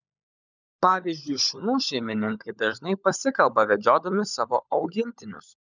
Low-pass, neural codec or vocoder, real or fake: 7.2 kHz; codec, 16 kHz, 16 kbps, FunCodec, trained on LibriTTS, 50 frames a second; fake